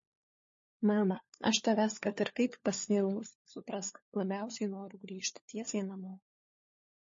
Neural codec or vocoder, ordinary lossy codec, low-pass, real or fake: codec, 16 kHz, 4 kbps, FunCodec, trained on LibriTTS, 50 frames a second; MP3, 32 kbps; 7.2 kHz; fake